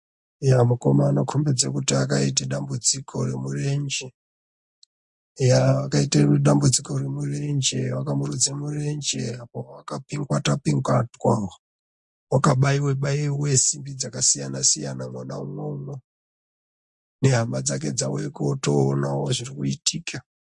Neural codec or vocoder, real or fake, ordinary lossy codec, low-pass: none; real; MP3, 48 kbps; 10.8 kHz